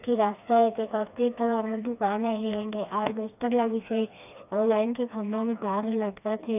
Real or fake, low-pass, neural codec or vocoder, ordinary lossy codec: fake; 3.6 kHz; codec, 16 kHz, 2 kbps, FreqCodec, smaller model; none